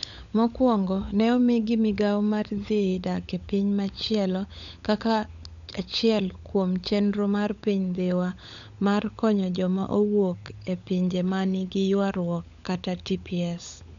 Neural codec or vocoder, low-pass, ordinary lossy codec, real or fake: codec, 16 kHz, 16 kbps, FunCodec, trained on LibriTTS, 50 frames a second; 7.2 kHz; none; fake